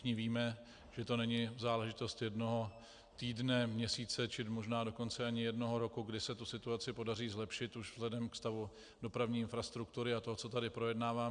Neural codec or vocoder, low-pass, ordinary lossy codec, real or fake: none; 9.9 kHz; Opus, 64 kbps; real